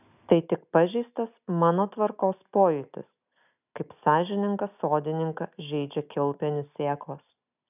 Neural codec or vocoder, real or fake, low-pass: none; real; 3.6 kHz